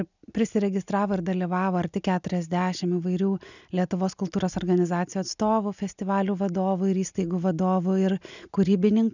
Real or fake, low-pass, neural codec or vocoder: real; 7.2 kHz; none